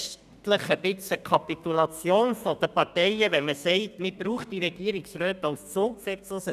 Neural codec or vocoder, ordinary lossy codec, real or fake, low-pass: codec, 32 kHz, 1.9 kbps, SNAC; none; fake; 14.4 kHz